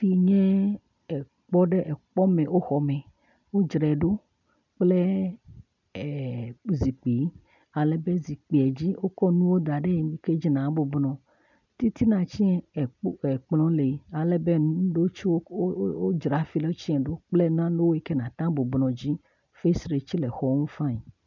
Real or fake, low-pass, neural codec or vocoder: real; 7.2 kHz; none